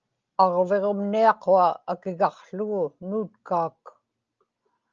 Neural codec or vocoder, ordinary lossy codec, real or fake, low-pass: none; Opus, 24 kbps; real; 7.2 kHz